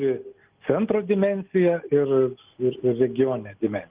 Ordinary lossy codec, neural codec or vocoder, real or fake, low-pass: Opus, 32 kbps; none; real; 3.6 kHz